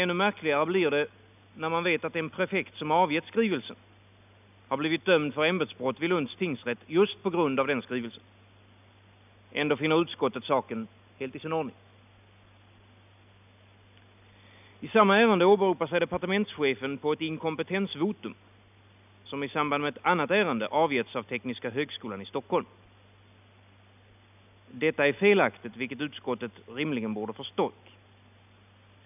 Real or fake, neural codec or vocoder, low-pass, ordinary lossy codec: real; none; 3.6 kHz; none